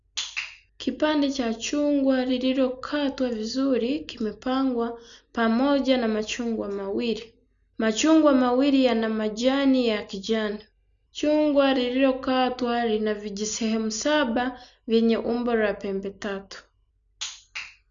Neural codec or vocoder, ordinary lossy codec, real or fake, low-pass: none; none; real; 7.2 kHz